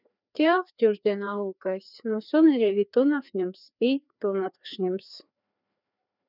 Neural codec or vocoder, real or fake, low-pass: codec, 16 kHz, 2 kbps, FreqCodec, larger model; fake; 5.4 kHz